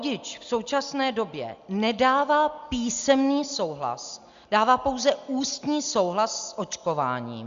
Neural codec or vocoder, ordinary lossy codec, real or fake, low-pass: none; Opus, 64 kbps; real; 7.2 kHz